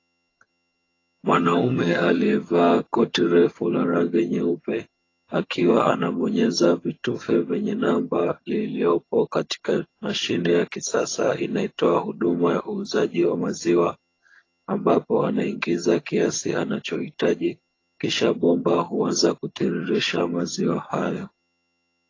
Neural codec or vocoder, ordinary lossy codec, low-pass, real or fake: vocoder, 22.05 kHz, 80 mel bands, HiFi-GAN; AAC, 32 kbps; 7.2 kHz; fake